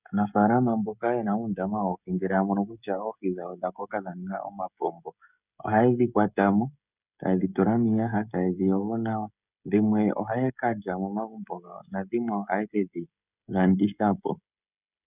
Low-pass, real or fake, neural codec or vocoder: 3.6 kHz; fake; codec, 16 kHz, 16 kbps, FreqCodec, smaller model